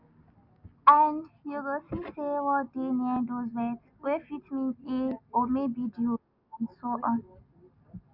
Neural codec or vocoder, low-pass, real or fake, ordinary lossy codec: none; 5.4 kHz; real; none